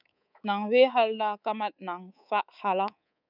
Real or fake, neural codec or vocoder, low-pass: fake; codec, 24 kHz, 3.1 kbps, DualCodec; 5.4 kHz